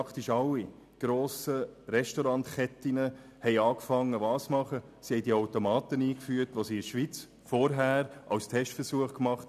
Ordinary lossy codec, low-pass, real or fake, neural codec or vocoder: none; 14.4 kHz; real; none